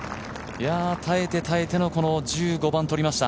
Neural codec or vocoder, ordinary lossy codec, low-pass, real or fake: none; none; none; real